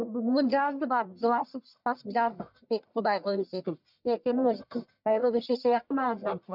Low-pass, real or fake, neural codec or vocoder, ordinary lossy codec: 5.4 kHz; fake; codec, 44.1 kHz, 1.7 kbps, Pupu-Codec; none